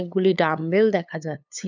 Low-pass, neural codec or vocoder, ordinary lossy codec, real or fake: 7.2 kHz; codec, 16 kHz, 8 kbps, FunCodec, trained on LibriTTS, 25 frames a second; none; fake